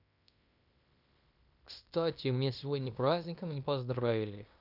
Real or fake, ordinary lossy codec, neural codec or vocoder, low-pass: fake; none; codec, 16 kHz in and 24 kHz out, 0.9 kbps, LongCat-Audio-Codec, fine tuned four codebook decoder; 5.4 kHz